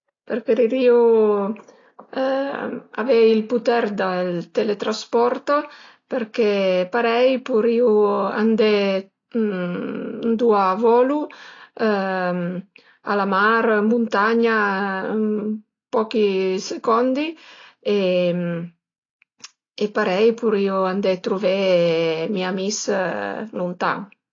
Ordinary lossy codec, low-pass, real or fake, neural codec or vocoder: AAC, 32 kbps; 7.2 kHz; real; none